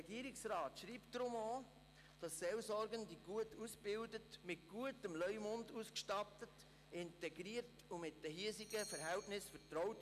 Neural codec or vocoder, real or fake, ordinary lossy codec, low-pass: none; real; none; 14.4 kHz